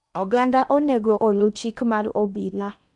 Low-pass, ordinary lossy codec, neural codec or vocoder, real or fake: 10.8 kHz; none; codec, 16 kHz in and 24 kHz out, 0.8 kbps, FocalCodec, streaming, 65536 codes; fake